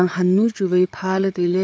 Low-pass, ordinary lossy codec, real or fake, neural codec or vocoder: none; none; fake; codec, 16 kHz, 4 kbps, FreqCodec, larger model